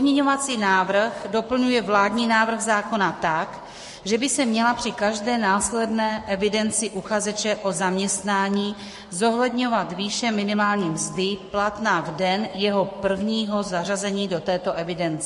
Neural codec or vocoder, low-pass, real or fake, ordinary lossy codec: codec, 44.1 kHz, 7.8 kbps, Pupu-Codec; 14.4 kHz; fake; MP3, 48 kbps